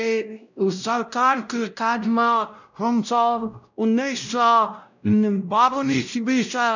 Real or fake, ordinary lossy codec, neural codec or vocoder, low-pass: fake; none; codec, 16 kHz, 0.5 kbps, X-Codec, WavLM features, trained on Multilingual LibriSpeech; 7.2 kHz